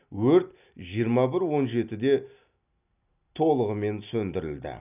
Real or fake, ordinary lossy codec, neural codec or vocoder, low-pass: real; none; none; 3.6 kHz